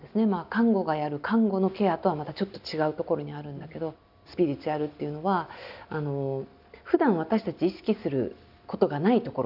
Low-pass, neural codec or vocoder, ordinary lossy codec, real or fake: 5.4 kHz; none; none; real